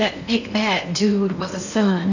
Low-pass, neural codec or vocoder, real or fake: 7.2 kHz; codec, 16 kHz in and 24 kHz out, 0.8 kbps, FocalCodec, streaming, 65536 codes; fake